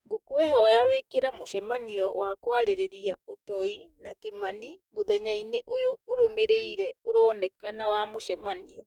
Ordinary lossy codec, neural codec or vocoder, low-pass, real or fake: none; codec, 44.1 kHz, 2.6 kbps, DAC; 19.8 kHz; fake